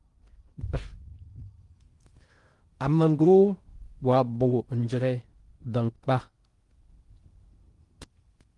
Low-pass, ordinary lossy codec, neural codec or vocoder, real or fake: 10.8 kHz; Opus, 24 kbps; codec, 16 kHz in and 24 kHz out, 0.6 kbps, FocalCodec, streaming, 4096 codes; fake